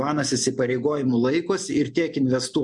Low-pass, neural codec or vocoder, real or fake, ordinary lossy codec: 10.8 kHz; none; real; MP3, 64 kbps